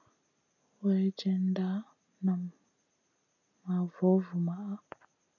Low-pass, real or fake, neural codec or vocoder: 7.2 kHz; real; none